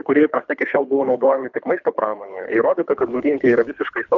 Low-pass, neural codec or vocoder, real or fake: 7.2 kHz; codec, 24 kHz, 3 kbps, HILCodec; fake